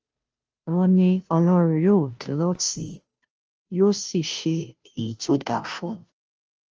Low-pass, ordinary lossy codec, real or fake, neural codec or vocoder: 7.2 kHz; Opus, 24 kbps; fake; codec, 16 kHz, 0.5 kbps, FunCodec, trained on Chinese and English, 25 frames a second